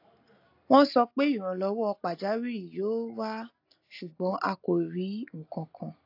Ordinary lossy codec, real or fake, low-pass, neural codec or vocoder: AAC, 32 kbps; real; 5.4 kHz; none